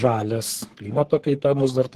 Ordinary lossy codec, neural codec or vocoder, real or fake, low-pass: Opus, 16 kbps; codec, 44.1 kHz, 3.4 kbps, Pupu-Codec; fake; 14.4 kHz